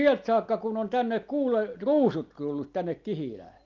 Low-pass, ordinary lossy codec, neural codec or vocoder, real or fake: 7.2 kHz; Opus, 24 kbps; none; real